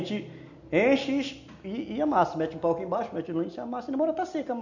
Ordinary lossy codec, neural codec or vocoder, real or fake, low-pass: none; none; real; 7.2 kHz